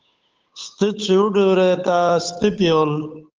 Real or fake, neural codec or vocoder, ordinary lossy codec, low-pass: fake; codec, 16 kHz, 8 kbps, FunCodec, trained on Chinese and English, 25 frames a second; Opus, 24 kbps; 7.2 kHz